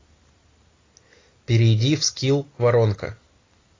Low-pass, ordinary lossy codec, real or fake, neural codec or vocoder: 7.2 kHz; AAC, 32 kbps; real; none